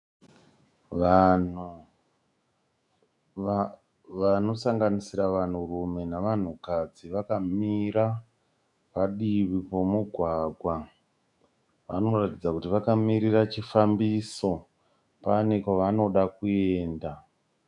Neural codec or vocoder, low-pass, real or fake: autoencoder, 48 kHz, 128 numbers a frame, DAC-VAE, trained on Japanese speech; 10.8 kHz; fake